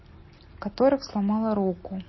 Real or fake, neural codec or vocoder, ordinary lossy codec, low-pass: real; none; MP3, 24 kbps; 7.2 kHz